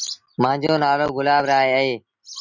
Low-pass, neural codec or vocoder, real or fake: 7.2 kHz; none; real